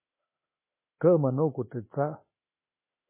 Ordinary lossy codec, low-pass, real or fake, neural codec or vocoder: MP3, 16 kbps; 3.6 kHz; fake; autoencoder, 48 kHz, 32 numbers a frame, DAC-VAE, trained on Japanese speech